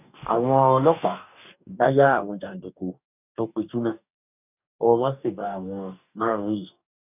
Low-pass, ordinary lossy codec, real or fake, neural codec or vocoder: 3.6 kHz; none; fake; codec, 44.1 kHz, 2.6 kbps, DAC